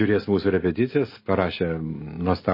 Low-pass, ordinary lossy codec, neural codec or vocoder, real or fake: 5.4 kHz; MP3, 24 kbps; none; real